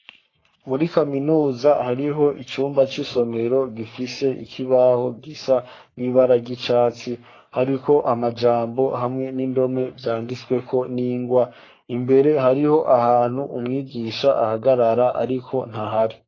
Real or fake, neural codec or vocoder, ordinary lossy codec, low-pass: fake; codec, 44.1 kHz, 3.4 kbps, Pupu-Codec; AAC, 32 kbps; 7.2 kHz